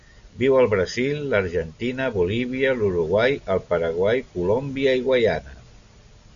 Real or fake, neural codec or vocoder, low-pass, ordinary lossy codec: real; none; 7.2 kHz; AAC, 96 kbps